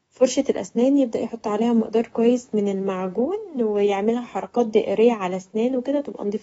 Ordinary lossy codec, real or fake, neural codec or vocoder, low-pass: AAC, 24 kbps; fake; codec, 24 kHz, 3.1 kbps, DualCodec; 10.8 kHz